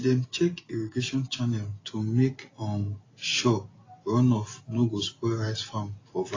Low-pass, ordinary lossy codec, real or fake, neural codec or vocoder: 7.2 kHz; AAC, 32 kbps; real; none